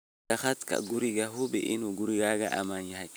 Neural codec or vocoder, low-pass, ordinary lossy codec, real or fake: none; none; none; real